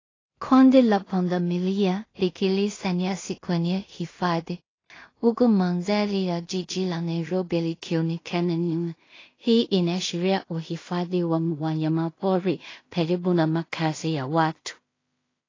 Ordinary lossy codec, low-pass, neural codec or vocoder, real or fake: AAC, 32 kbps; 7.2 kHz; codec, 16 kHz in and 24 kHz out, 0.4 kbps, LongCat-Audio-Codec, two codebook decoder; fake